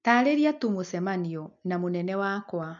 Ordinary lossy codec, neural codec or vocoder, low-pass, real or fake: MP3, 64 kbps; none; 7.2 kHz; real